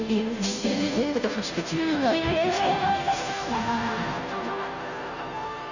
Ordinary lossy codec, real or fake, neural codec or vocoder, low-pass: none; fake; codec, 16 kHz, 0.5 kbps, FunCodec, trained on Chinese and English, 25 frames a second; 7.2 kHz